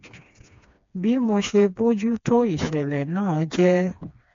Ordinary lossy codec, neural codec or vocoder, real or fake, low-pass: AAC, 48 kbps; codec, 16 kHz, 2 kbps, FreqCodec, smaller model; fake; 7.2 kHz